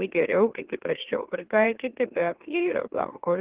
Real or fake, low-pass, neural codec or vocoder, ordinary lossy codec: fake; 3.6 kHz; autoencoder, 44.1 kHz, a latent of 192 numbers a frame, MeloTTS; Opus, 16 kbps